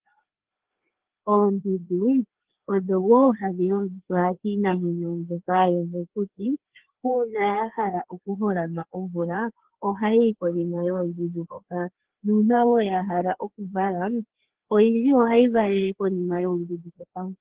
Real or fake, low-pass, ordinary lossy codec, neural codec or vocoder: fake; 3.6 kHz; Opus, 16 kbps; codec, 16 kHz, 2 kbps, FreqCodec, larger model